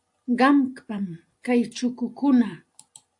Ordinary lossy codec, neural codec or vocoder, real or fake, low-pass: AAC, 64 kbps; none; real; 10.8 kHz